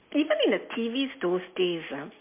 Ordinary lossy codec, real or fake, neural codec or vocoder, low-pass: MP3, 24 kbps; real; none; 3.6 kHz